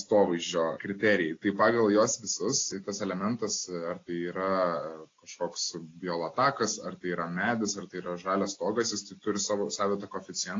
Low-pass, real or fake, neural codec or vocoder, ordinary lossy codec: 7.2 kHz; real; none; AAC, 32 kbps